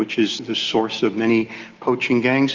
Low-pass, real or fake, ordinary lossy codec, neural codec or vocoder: 7.2 kHz; real; Opus, 32 kbps; none